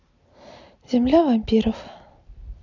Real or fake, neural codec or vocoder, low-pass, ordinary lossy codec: real; none; 7.2 kHz; none